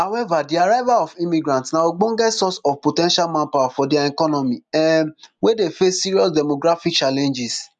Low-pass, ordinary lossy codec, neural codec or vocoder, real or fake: 10.8 kHz; none; none; real